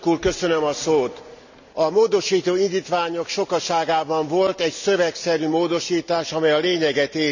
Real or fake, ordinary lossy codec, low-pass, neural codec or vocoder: real; none; 7.2 kHz; none